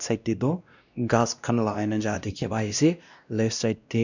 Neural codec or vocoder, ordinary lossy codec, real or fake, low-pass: codec, 16 kHz, 1 kbps, X-Codec, WavLM features, trained on Multilingual LibriSpeech; none; fake; 7.2 kHz